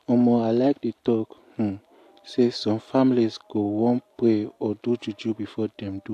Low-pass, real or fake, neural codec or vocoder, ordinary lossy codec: 14.4 kHz; real; none; AAC, 64 kbps